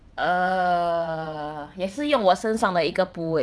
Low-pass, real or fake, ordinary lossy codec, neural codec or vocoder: none; fake; none; vocoder, 22.05 kHz, 80 mel bands, WaveNeXt